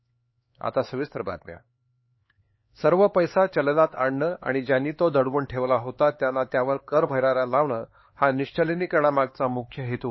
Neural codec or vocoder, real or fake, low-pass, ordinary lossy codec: codec, 16 kHz, 4 kbps, X-Codec, HuBERT features, trained on LibriSpeech; fake; 7.2 kHz; MP3, 24 kbps